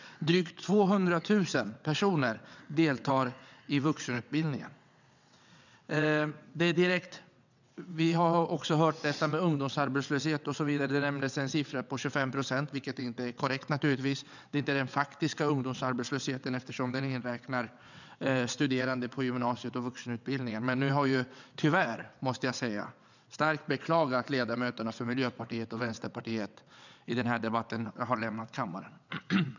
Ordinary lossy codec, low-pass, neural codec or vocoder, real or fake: none; 7.2 kHz; vocoder, 22.05 kHz, 80 mel bands, WaveNeXt; fake